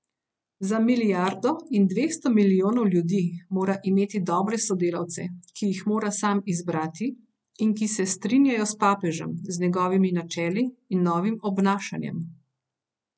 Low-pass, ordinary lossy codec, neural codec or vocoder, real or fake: none; none; none; real